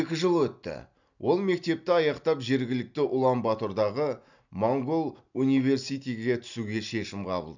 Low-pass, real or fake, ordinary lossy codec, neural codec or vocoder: 7.2 kHz; real; none; none